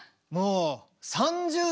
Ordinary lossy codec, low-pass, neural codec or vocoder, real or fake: none; none; none; real